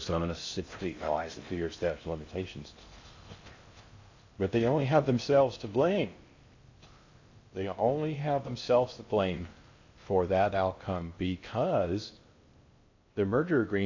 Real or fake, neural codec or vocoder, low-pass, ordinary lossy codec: fake; codec, 16 kHz in and 24 kHz out, 0.6 kbps, FocalCodec, streaming, 4096 codes; 7.2 kHz; AAC, 48 kbps